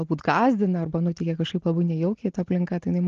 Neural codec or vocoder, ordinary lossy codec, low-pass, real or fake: none; Opus, 16 kbps; 7.2 kHz; real